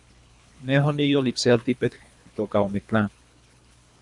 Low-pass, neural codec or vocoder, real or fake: 10.8 kHz; codec, 24 kHz, 3 kbps, HILCodec; fake